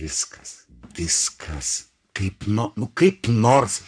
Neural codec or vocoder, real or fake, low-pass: codec, 44.1 kHz, 3.4 kbps, Pupu-Codec; fake; 9.9 kHz